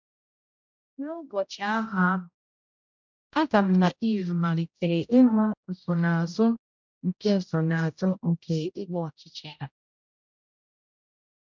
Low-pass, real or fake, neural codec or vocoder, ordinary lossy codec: 7.2 kHz; fake; codec, 16 kHz, 0.5 kbps, X-Codec, HuBERT features, trained on general audio; MP3, 64 kbps